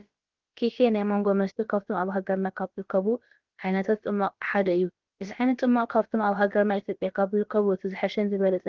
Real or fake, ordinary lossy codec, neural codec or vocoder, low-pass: fake; Opus, 16 kbps; codec, 16 kHz, about 1 kbps, DyCAST, with the encoder's durations; 7.2 kHz